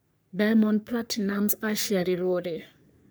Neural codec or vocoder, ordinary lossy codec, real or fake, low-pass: codec, 44.1 kHz, 3.4 kbps, Pupu-Codec; none; fake; none